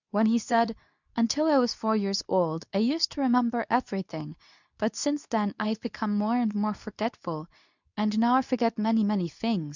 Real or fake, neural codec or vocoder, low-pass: fake; codec, 24 kHz, 0.9 kbps, WavTokenizer, medium speech release version 2; 7.2 kHz